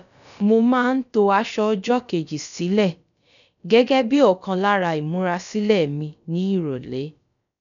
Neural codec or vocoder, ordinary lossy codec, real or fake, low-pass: codec, 16 kHz, about 1 kbps, DyCAST, with the encoder's durations; none; fake; 7.2 kHz